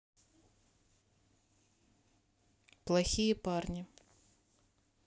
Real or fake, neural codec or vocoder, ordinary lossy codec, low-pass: real; none; none; none